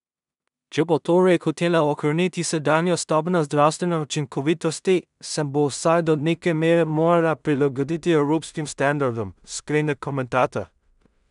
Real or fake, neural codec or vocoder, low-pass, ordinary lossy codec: fake; codec, 16 kHz in and 24 kHz out, 0.4 kbps, LongCat-Audio-Codec, two codebook decoder; 10.8 kHz; none